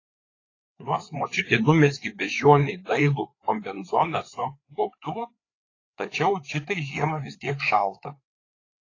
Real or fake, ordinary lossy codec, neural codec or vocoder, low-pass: fake; AAC, 32 kbps; codec, 16 kHz, 4 kbps, FreqCodec, larger model; 7.2 kHz